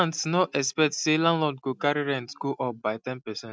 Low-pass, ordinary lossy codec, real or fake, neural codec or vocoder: none; none; real; none